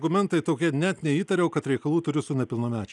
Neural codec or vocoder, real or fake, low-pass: none; real; 10.8 kHz